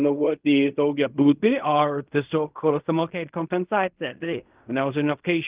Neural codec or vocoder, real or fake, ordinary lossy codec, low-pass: codec, 16 kHz in and 24 kHz out, 0.4 kbps, LongCat-Audio-Codec, fine tuned four codebook decoder; fake; Opus, 24 kbps; 3.6 kHz